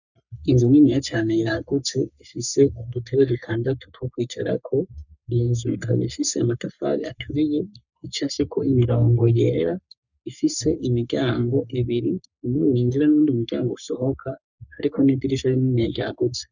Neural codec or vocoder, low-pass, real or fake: codec, 44.1 kHz, 3.4 kbps, Pupu-Codec; 7.2 kHz; fake